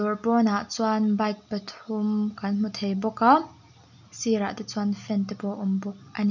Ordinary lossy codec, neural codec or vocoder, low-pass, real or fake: none; none; 7.2 kHz; real